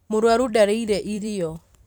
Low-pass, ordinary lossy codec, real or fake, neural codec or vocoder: none; none; fake; vocoder, 44.1 kHz, 128 mel bands every 256 samples, BigVGAN v2